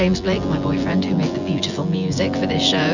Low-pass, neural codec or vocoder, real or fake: 7.2 kHz; vocoder, 24 kHz, 100 mel bands, Vocos; fake